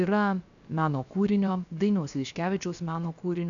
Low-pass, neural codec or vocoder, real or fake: 7.2 kHz; codec, 16 kHz, about 1 kbps, DyCAST, with the encoder's durations; fake